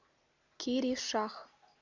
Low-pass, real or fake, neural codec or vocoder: 7.2 kHz; real; none